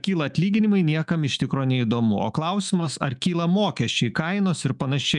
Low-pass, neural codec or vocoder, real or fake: 10.8 kHz; autoencoder, 48 kHz, 128 numbers a frame, DAC-VAE, trained on Japanese speech; fake